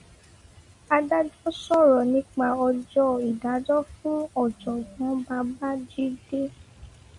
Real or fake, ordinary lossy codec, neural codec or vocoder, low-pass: real; MP3, 48 kbps; none; 10.8 kHz